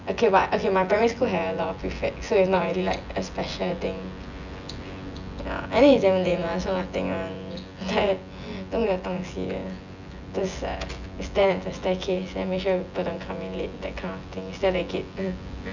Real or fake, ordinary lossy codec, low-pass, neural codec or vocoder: fake; none; 7.2 kHz; vocoder, 24 kHz, 100 mel bands, Vocos